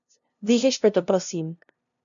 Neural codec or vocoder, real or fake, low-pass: codec, 16 kHz, 0.5 kbps, FunCodec, trained on LibriTTS, 25 frames a second; fake; 7.2 kHz